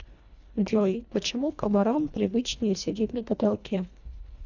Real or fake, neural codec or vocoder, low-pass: fake; codec, 24 kHz, 1.5 kbps, HILCodec; 7.2 kHz